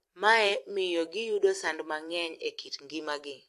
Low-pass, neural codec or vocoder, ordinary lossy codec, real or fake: 14.4 kHz; vocoder, 44.1 kHz, 128 mel bands every 256 samples, BigVGAN v2; AAC, 64 kbps; fake